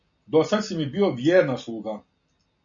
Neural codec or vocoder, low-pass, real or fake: none; 7.2 kHz; real